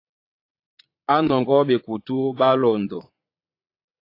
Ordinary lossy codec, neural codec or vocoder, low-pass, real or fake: AAC, 32 kbps; vocoder, 22.05 kHz, 80 mel bands, Vocos; 5.4 kHz; fake